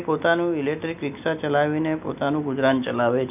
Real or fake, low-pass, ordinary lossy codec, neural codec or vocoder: real; 3.6 kHz; none; none